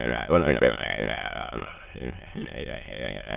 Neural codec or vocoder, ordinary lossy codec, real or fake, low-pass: autoencoder, 22.05 kHz, a latent of 192 numbers a frame, VITS, trained on many speakers; Opus, 32 kbps; fake; 3.6 kHz